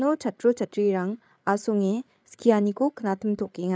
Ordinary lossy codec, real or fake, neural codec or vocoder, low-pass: none; fake; codec, 16 kHz, 8 kbps, FreqCodec, larger model; none